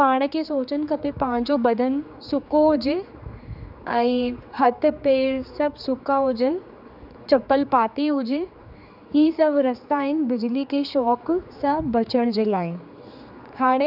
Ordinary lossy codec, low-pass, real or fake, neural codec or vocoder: none; 5.4 kHz; fake; codec, 16 kHz, 4 kbps, X-Codec, HuBERT features, trained on general audio